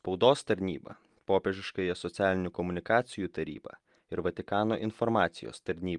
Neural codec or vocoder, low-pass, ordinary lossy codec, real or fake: none; 10.8 kHz; Opus, 32 kbps; real